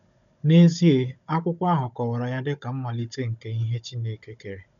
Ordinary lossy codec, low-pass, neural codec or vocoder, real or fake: none; 7.2 kHz; codec, 16 kHz, 16 kbps, FunCodec, trained on Chinese and English, 50 frames a second; fake